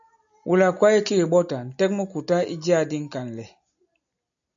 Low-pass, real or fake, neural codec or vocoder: 7.2 kHz; real; none